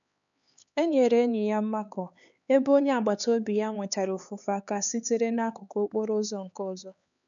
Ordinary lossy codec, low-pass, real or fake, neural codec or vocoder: none; 7.2 kHz; fake; codec, 16 kHz, 4 kbps, X-Codec, HuBERT features, trained on LibriSpeech